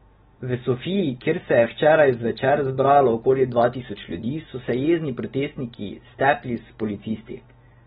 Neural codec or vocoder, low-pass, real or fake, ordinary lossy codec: none; 19.8 kHz; real; AAC, 16 kbps